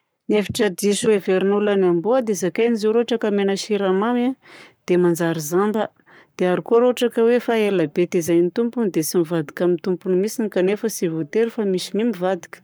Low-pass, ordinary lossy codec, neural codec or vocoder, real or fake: none; none; codec, 44.1 kHz, 7.8 kbps, Pupu-Codec; fake